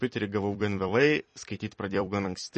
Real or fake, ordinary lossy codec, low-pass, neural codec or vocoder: fake; MP3, 32 kbps; 10.8 kHz; vocoder, 44.1 kHz, 128 mel bands every 256 samples, BigVGAN v2